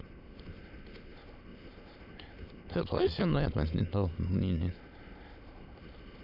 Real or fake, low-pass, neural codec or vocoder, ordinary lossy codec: fake; 5.4 kHz; autoencoder, 22.05 kHz, a latent of 192 numbers a frame, VITS, trained on many speakers; AAC, 48 kbps